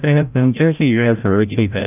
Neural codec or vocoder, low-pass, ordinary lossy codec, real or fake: codec, 16 kHz, 0.5 kbps, FreqCodec, larger model; 3.6 kHz; none; fake